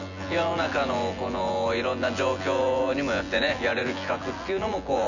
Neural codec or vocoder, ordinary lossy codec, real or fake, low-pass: vocoder, 24 kHz, 100 mel bands, Vocos; none; fake; 7.2 kHz